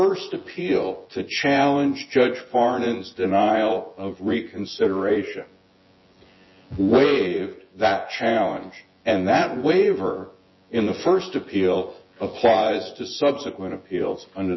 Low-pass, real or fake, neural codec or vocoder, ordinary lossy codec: 7.2 kHz; fake; vocoder, 24 kHz, 100 mel bands, Vocos; MP3, 24 kbps